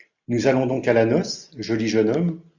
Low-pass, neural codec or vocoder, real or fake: 7.2 kHz; none; real